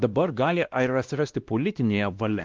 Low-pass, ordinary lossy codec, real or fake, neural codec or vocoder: 7.2 kHz; Opus, 24 kbps; fake; codec, 16 kHz, 1 kbps, X-Codec, WavLM features, trained on Multilingual LibriSpeech